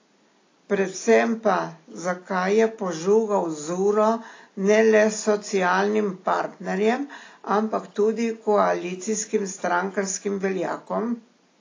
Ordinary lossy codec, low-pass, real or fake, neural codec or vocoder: AAC, 32 kbps; 7.2 kHz; real; none